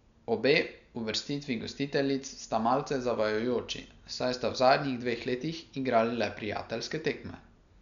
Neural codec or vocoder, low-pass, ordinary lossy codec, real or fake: none; 7.2 kHz; none; real